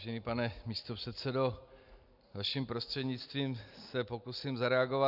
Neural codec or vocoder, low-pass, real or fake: none; 5.4 kHz; real